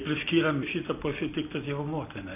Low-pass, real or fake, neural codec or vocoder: 3.6 kHz; real; none